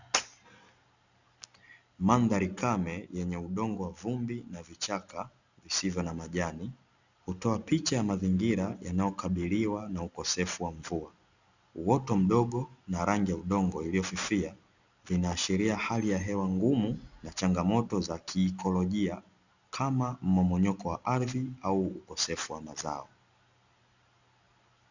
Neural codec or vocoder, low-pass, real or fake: none; 7.2 kHz; real